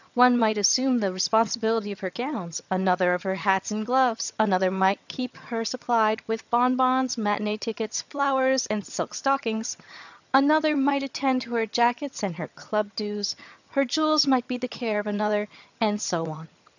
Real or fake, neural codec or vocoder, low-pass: fake; vocoder, 22.05 kHz, 80 mel bands, HiFi-GAN; 7.2 kHz